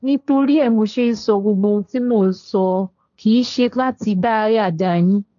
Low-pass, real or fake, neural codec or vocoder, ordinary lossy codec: 7.2 kHz; fake; codec, 16 kHz, 1.1 kbps, Voila-Tokenizer; none